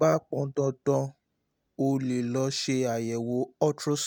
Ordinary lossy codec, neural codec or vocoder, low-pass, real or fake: none; none; none; real